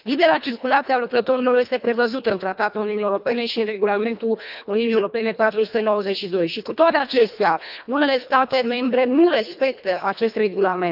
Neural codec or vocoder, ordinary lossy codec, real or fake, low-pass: codec, 24 kHz, 1.5 kbps, HILCodec; none; fake; 5.4 kHz